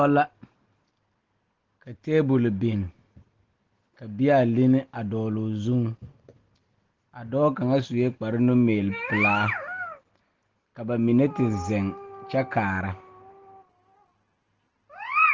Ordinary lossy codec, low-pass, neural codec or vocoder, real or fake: Opus, 16 kbps; 7.2 kHz; none; real